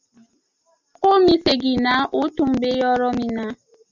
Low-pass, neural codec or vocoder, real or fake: 7.2 kHz; none; real